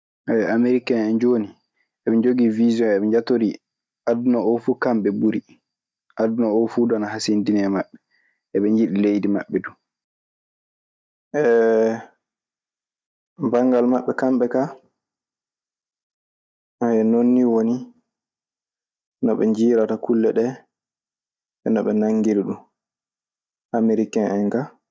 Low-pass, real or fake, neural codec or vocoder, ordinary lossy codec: none; real; none; none